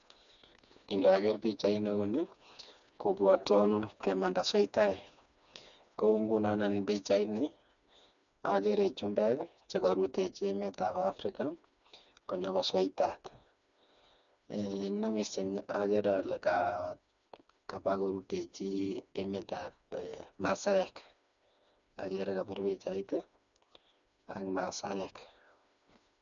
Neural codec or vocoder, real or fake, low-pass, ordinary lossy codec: codec, 16 kHz, 2 kbps, FreqCodec, smaller model; fake; 7.2 kHz; none